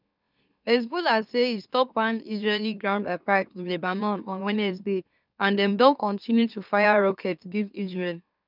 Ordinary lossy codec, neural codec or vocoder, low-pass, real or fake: none; autoencoder, 44.1 kHz, a latent of 192 numbers a frame, MeloTTS; 5.4 kHz; fake